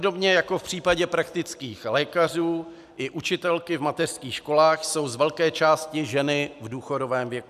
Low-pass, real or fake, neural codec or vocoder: 14.4 kHz; real; none